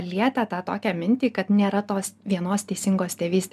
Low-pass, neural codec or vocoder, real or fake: 14.4 kHz; none; real